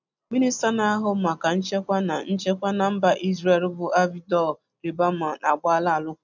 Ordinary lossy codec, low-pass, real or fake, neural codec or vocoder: none; 7.2 kHz; real; none